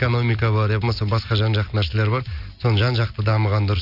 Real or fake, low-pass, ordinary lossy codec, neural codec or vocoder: real; 5.4 kHz; none; none